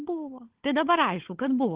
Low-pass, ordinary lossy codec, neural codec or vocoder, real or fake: 3.6 kHz; Opus, 16 kbps; codec, 16 kHz, 16 kbps, FunCodec, trained on LibriTTS, 50 frames a second; fake